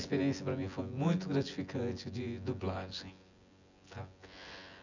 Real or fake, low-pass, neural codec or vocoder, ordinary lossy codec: fake; 7.2 kHz; vocoder, 24 kHz, 100 mel bands, Vocos; none